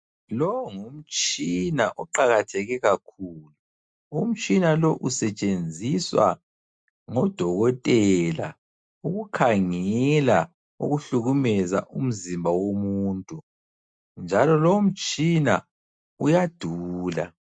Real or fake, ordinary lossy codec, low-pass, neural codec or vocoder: real; MP3, 64 kbps; 9.9 kHz; none